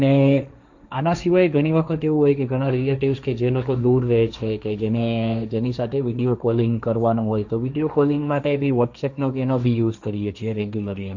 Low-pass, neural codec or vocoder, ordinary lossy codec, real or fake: 7.2 kHz; codec, 16 kHz, 1.1 kbps, Voila-Tokenizer; none; fake